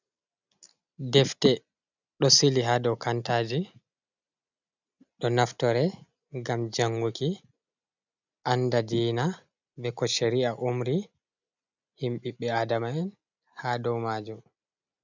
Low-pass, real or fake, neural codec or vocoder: 7.2 kHz; real; none